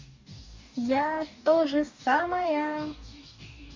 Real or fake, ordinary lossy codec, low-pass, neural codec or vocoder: fake; MP3, 48 kbps; 7.2 kHz; codec, 44.1 kHz, 2.6 kbps, DAC